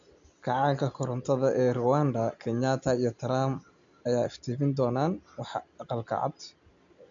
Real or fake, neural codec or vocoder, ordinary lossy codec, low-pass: real; none; MP3, 64 kbps; 7.2 kHz